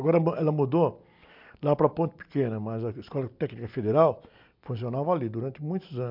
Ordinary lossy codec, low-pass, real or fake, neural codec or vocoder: MP3, 48 kbps; 5.4 kHz; real; none